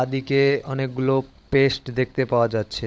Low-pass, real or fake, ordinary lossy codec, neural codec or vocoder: none; fake; none; codec, 16 kHz, 16 kbps, FunCodec, trained on LibriTTS, 50 frames a second